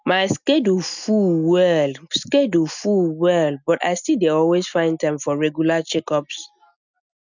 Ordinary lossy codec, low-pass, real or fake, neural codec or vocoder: none; 7.2 kHz; real; none